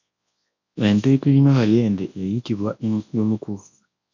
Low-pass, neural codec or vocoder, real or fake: 7.2 kHz; codec, 24 kHz, 0.9 kbps, WavTokenizer, large speech release; fake